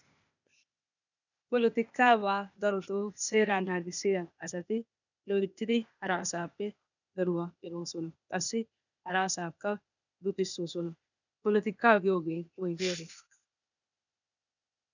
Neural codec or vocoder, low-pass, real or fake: codec, 16 kHz, 0.8 kbps, ZipCodec; 7.2 kHz; fake